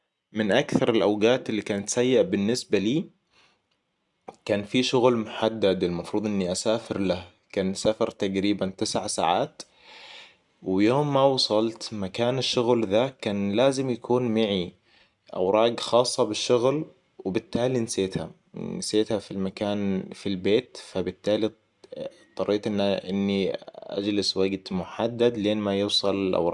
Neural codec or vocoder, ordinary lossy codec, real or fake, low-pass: none; none; real; 10.8 kHz